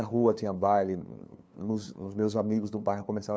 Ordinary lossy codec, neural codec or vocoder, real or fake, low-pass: none; codec, 16 kHz, 2 kbps, FunCodec, trained on LibriTTS, 25 frames a second; fake; none